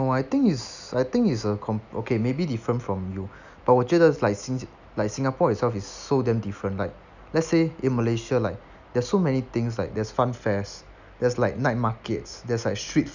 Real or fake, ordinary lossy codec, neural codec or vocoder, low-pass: real; none; none; 7.2 kHz